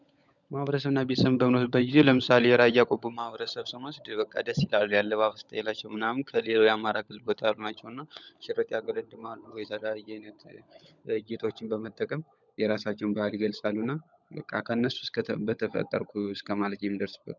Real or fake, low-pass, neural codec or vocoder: fake; 7.2 kHz; codec, 16 kHz, 16 kbps, FunCodec, trained on LibriTTS, 50 frames a second